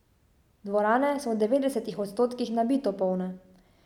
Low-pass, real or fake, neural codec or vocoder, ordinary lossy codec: 19.8 kHz; real; none; none